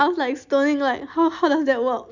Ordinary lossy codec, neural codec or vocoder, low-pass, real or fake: none; none; 7.2 kHz; real